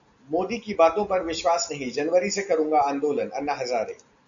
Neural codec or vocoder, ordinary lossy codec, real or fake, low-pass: none; MP3, 96 kbps; real; 7.2 kHz